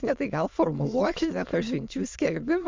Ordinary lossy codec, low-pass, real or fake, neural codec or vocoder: MP3, 64 kbps; 7.2 kHz; fake; autoencoder, 22.05 kHz, a latent of 192 numbers a frame, VITS, trained on many speakers